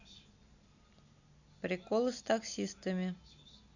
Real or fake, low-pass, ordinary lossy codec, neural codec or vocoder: real; 7.2 kHz; none; none